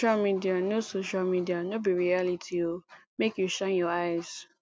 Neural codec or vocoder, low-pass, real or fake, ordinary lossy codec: none; none; real; none